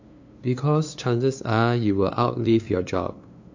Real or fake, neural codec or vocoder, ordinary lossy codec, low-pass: fake; codec, 16 kHz, 2 kbps, FunCodec, trained on LibriTTS, 25 frames a second; AAC, 48 kbps; 7.2 kHz